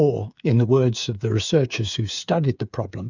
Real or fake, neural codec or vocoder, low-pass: fake; codec, 16 kHz, 8 kbps, FreqCodec, smaller model; 7.2 kHz